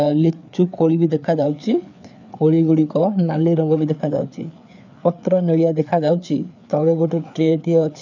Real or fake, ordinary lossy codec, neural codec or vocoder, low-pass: fake; none; codec, 16 kHz, 4 kbps, FreqCodec, larger model; 7.2 kHz